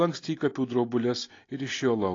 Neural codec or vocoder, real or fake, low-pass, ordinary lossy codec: none; real; 7.2 kHz; AAC, 32 kbps